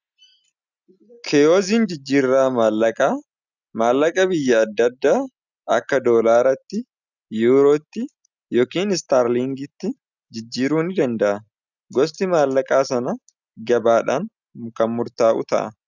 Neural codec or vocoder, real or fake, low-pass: none; real; 7.2 kHz